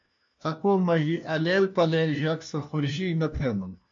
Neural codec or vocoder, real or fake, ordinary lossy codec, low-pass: codec, 16 kHz, 1 kbps, FunCodec, trained on LibriTTS, 50 frames a second; fake; MP3, 48 kbps; 7.2 kHz